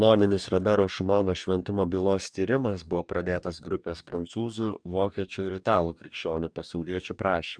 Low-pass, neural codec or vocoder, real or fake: 9.9 kHz; codec, 44.1 kHz, 2.6 kbps, DAC; fake